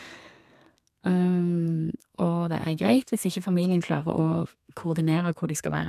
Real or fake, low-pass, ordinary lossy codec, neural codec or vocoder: fake; 14.4 kHz; none; codec, 32 kHz, 1.9 kbps, SNAC